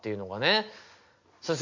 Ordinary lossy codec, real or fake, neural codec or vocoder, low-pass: MP3, 48 kbps; real; none; 7.2 kHz